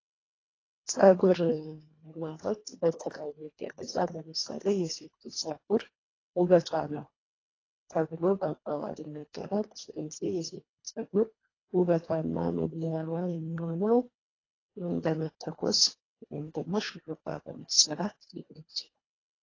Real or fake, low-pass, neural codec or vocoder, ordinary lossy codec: fake; 7.2 kHz; codec, 24 kHz, 1.5 kbps, HILCodec; AAC, 32 kbps